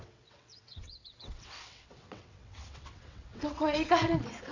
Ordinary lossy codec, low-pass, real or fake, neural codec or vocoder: none; 7.2 kHz; fake; vocoder, 22.05 kHz, 80 mel bands, WaveNeXt